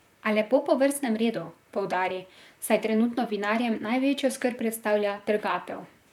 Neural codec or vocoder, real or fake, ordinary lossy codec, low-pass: vocoder, 44.1 kHz, 128 mel bands, Pupu-Vocoder; fake; none; 19.8 kHz